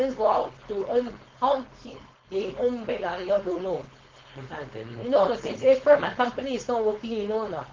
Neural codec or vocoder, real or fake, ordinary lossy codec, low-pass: codec, 16 kHz, 4.8 kbps, FACodec; fake; Opus, 16 kbps; 7.2 kHz